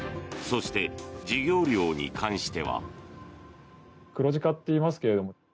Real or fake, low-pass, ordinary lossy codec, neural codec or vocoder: real; none; none; none